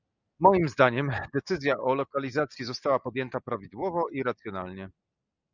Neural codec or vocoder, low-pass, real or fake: none; 7.2 kHz; real